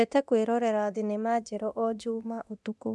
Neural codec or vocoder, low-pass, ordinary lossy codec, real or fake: codec, 24 kHz, 0.9 kbps, DualCodec; none; none; fake